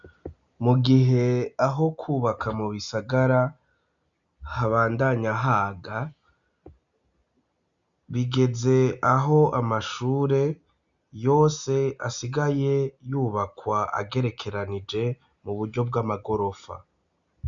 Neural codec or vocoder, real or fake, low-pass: none; real; 7.2 kHz